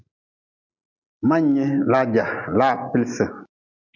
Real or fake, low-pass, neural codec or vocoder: real; 7.2 kHz; none